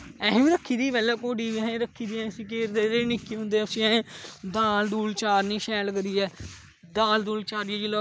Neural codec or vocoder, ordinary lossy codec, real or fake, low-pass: none; none; real; none